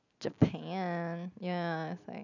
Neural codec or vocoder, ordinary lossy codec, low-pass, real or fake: none; none; 7.2 kHz; real